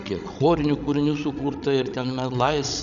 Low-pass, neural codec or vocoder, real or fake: 7.2 kHz; codec, 16 kHz, 16 kbps, FreqCodec, larger model; fake